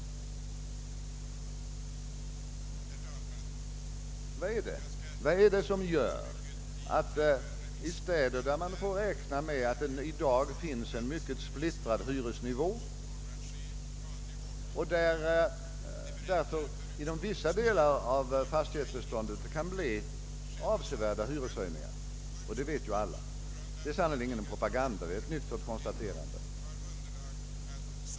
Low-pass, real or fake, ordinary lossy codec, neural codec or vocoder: none; real; none; none